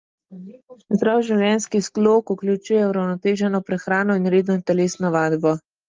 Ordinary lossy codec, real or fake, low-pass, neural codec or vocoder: Opus, 32 kbps; real; 7.2 kHz; none